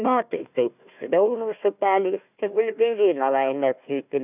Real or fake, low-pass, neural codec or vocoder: fake; 3.6 kHz; codec, 16 kHz, 1 kbps, FunCodec, trained on Chinese and English, 50 frames a second